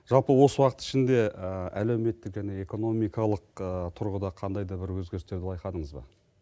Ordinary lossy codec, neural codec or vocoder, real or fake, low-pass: none; none; real; none